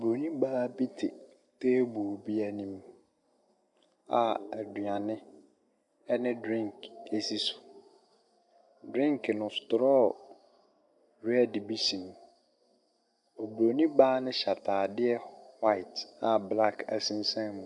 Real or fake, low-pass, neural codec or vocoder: real; 10.8 kHz; none